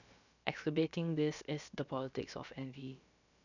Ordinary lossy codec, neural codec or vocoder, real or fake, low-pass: none; codec, 16 kHz, about 1 kbps, DyCAST, with the encoder's durations; fake; 7.2 kHz